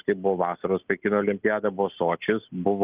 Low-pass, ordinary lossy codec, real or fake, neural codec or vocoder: 3.6 kHz; Opus, 32 kbps; real; none